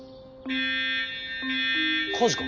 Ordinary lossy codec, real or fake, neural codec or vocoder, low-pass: none; real; none; 7.2 kHz